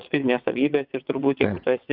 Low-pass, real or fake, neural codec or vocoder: 5.4 kHz; fake; vocoder, 22.05 kHz, 80 mel bands, WaveNeXt